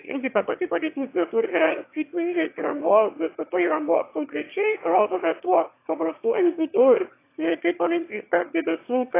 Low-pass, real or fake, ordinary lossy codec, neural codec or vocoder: 3.6 kHz; fake; AAC, 24 kbps; autoencoder, 22.05 kHz, a latent of 192 numbers a frame, VITS, trained on one speaker